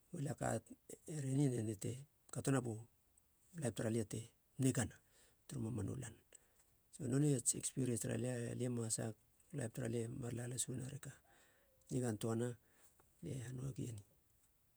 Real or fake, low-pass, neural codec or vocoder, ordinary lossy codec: fake; none; vocoder, 48 kHz, 128 mel bands, Vocos; none